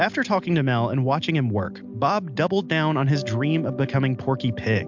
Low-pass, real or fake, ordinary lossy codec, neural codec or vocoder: 7.2 kHz; real; MP3, 64 kbps; none